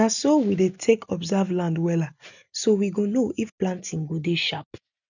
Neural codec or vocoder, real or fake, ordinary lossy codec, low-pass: none; real; none; 7.2 kHz